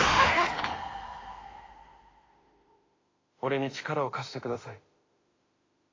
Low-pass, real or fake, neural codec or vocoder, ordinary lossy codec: 7.2 kHz; fake; autoencoder, 48 kHz, 32 numbers a frame, DAC-VAE, trained on Japanese speech; AAC, 32 kbps